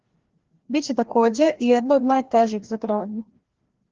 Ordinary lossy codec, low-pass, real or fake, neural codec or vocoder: Opus, 16 kbps; 7.2 kHz; fake; codec, 16 kHz, 1 kbps, FreqCodec, larger model